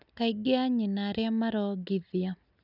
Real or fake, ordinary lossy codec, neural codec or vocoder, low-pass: real; none; none; 5.4 kHz